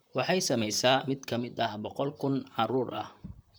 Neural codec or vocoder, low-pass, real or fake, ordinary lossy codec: vocoder, 44.1 kHz, 128 mel bands, Pupu-Vocoder; none; fake; none